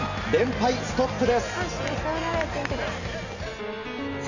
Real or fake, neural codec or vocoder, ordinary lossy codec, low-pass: real; none; none; 7.2 kHz